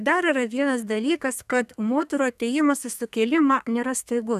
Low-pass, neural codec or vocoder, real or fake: 14.4 kHz; codec, 32 kHz, 1.9 kbps, SNAC; fake